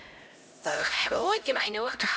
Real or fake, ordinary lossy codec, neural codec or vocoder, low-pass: fake; none; codec, 16 kHz, 0.5 kbps, X-Codec, HuBERT features, trained on LibriSpeech; none